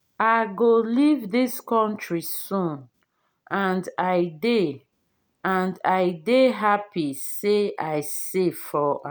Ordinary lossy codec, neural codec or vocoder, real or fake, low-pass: none; none; real; none